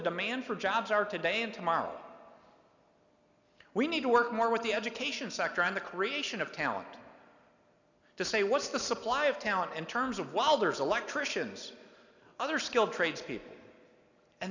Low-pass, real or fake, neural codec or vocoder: 7.2 kHz; real; none